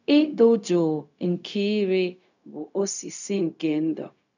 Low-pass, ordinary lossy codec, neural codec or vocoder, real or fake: 7.2 kHz; none; codec, 16 kHz, 0.4 kbps, LongCat-Audio-Codec; fake